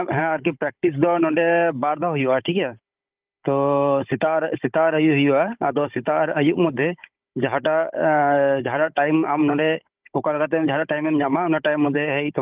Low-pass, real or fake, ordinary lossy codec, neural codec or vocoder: 3.6 kHz; fake; Opus, 32 kbps; codec, 16 kHz, 16 kbps, FunCodec, trained on Chinese and English, 50 frames a second